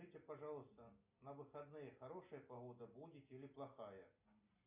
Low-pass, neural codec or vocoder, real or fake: 3.6 kHz; none; real